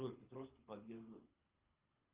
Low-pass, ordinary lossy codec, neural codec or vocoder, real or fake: 3.6 kHz; Opus, 32 kbps; codec, 24 kHz, 6 kbps, HILCodec; fake